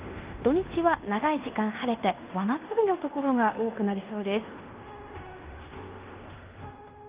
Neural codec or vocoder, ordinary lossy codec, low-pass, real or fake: codec, 16 kHz in and 24 kHz out, 0.9 kbps, LongCat-Audio-Codec, fine tuned four codebook decoder; Opus, 32 kbps; 3.6 kHz; fake